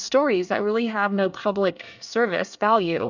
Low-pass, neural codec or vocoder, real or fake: 7.2 kHz; codec, 24 kHz, 1 kbps, SNAC; fake